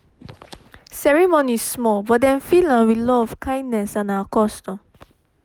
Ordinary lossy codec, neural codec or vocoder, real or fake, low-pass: none; none; real; none